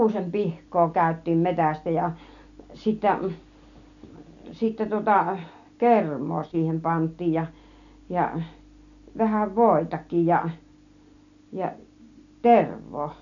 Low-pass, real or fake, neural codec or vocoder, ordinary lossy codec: 7.2 kHz; real; none; none